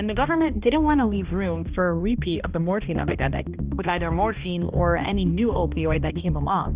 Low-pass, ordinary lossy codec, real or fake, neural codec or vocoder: 3.6 kHz; Opus, 64 kbps; fake; codec, 16 kHz, 1 kbps, X-Codec, HuBERT features, trained on balanced general audio